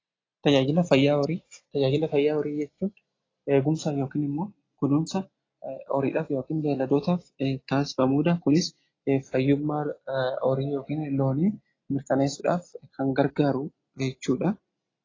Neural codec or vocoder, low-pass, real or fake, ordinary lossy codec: none; 7.2 kHz; real; AAC, 32 kbps